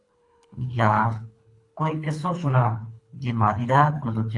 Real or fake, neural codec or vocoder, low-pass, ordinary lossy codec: fake; codec, 24 kHz, 3 kbps, HILCodec; 10.8 kHz; AAC, 48 kbps